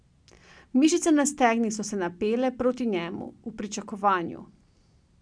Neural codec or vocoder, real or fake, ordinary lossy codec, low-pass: none; real; none; 9.9 kHz